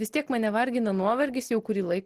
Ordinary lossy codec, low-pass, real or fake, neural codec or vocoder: Opus, 16 kbps; 14.4 kHz; real; none